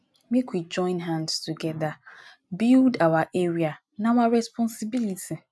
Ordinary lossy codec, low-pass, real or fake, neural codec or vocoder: none; none; real; none